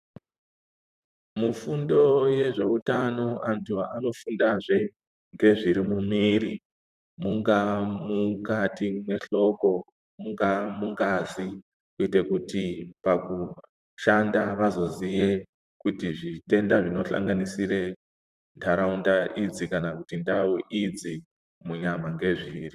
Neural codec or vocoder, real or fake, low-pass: vocoder, 44.1 kHz, 128 mel bands, Pupu-Vocoder; fake; 14.4 kHz